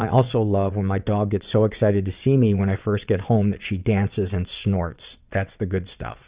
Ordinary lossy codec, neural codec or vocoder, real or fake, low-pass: Opus, 64 kbps; vocoder, 22.05 kHz, 80 mel bands, WaveNeXt; fake; 3.6 kHz